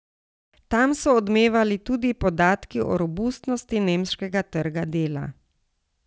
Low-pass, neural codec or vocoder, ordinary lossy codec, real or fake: none; none; none; real